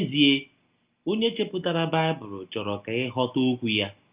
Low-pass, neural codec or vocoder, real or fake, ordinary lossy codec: 3.6 kHz; none; real; Opus, 24 kbps